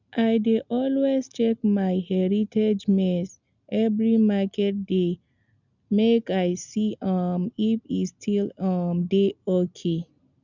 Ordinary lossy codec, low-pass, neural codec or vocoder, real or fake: none; 7.2 kHz; none; real